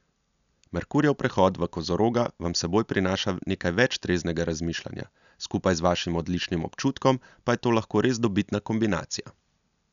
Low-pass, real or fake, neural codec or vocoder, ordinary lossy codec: 7.2 kHz; real; none; none